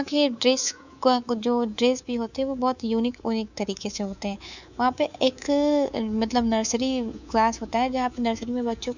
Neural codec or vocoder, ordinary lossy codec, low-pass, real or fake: codec, 24 kHz, 3.1 kbps, DualCodec; none; 7.2 kHz; fake